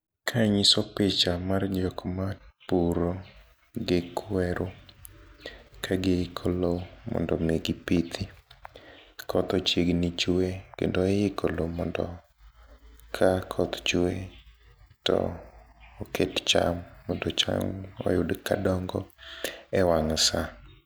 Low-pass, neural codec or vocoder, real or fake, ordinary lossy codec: none; none; real; none